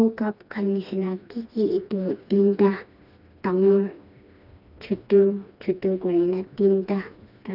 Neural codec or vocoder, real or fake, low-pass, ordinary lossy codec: codec, 16 kHz, 2 kbps, FreqCodec, smaller model; fake; 5.4 kHz; none